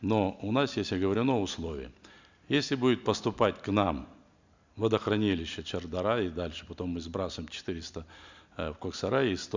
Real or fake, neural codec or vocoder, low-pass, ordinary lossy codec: real; none; 7.2 kHz; Opus, 64 kbps